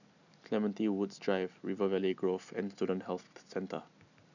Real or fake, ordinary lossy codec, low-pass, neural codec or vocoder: real; none; 7.2 kHz; none